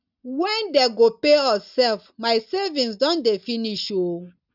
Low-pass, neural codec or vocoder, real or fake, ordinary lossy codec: 5.4 kHz; none; real; none